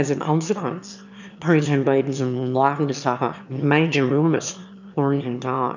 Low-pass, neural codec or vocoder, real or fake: 7.2 kHz; autoencoder, 22.05 kHz, a latent of 192 numbers a frame, VITS, trained on one speaker; fake